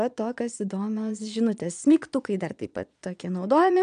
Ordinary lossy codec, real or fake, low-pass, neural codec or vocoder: MP3, 96 kbps; fake; 9.9 kHz; vocoder, 22.05 kHz, 80 mel bands, Vocos